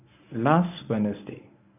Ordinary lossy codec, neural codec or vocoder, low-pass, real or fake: none; codec, 24 kHz, 0.9 kbps, WavTokenizer, medium speech release version 1; 3.6 kHz; fake